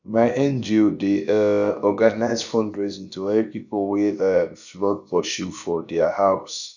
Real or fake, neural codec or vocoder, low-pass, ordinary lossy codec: fake; codec, 16 kHz, about 1 kbps, DyCAST, with the encoder's durations; 7.2 kHz; none